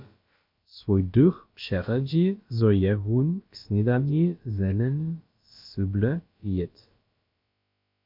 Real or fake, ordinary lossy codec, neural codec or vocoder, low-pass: fake; MP3, 48 kbps; codec, 16 kHz, about 1 kbps, DyCAST, with the encoder's durations; 5.4 kHz